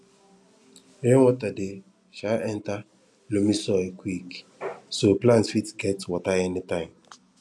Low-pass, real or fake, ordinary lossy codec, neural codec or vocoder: none; real; none; none